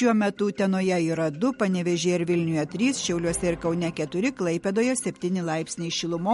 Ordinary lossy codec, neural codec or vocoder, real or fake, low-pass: MP3, 48 kbps; none; real; 19.8 kHz